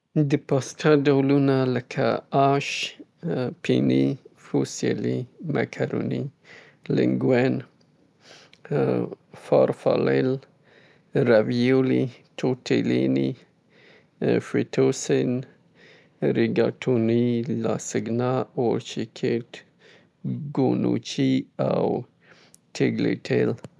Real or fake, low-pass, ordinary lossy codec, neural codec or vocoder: real; none; none; none